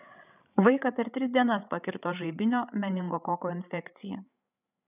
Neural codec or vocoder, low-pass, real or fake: codec, 16 kHz, 8 kbps, FreqCodec, larger model; 3.6 kHz; fake